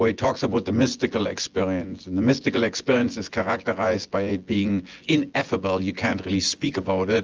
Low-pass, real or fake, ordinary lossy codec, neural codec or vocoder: 7.2 kHz; fake; Opus, 24 kbps; vocoder, 24 kHz, 100 mel bands, Vocos